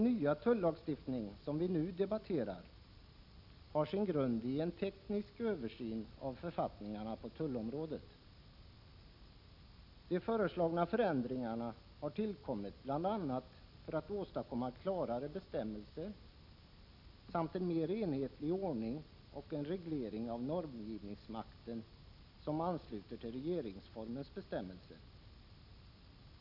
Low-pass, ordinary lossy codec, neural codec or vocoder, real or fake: 5.4 kHz; none; none; real